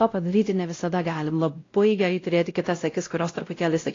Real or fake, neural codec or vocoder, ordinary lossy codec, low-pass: fake; codec, 16 kHz, 0.5 kbps, X-Codec, WavLM features, trained on Multilingual LibriSpeech; AAC, 32 kbps; 7.2 kHz